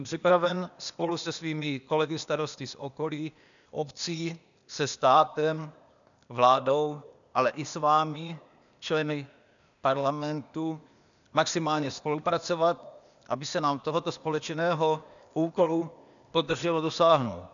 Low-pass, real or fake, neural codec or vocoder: 7.2 kHz; fake; codec, 16 kHz, 0.8 kbps, ZipCodec